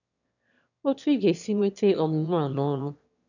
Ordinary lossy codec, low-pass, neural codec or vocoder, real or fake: none; 7.2 kHz; autoencoder, 22.05 kHz, a latent of 192 numbers a frame, VITS, trained on one speaker; fake